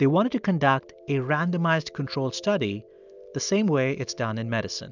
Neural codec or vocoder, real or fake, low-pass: none; real; 7.2 kHz